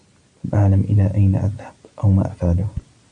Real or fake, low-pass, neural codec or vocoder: real; 9.9 kHz; none